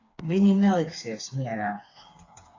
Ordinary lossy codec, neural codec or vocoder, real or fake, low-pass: AAC, 32 kbps; codec, 16 kHz, 4 kbps, FreqCodec, smaller model; fake; 7.2 kHz